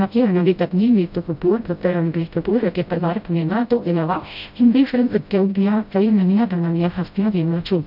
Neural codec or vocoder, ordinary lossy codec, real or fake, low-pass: codec, 16 kHz, 0.5 kbps, FreqCodec, smaller model; none; fake; 5.4 kHz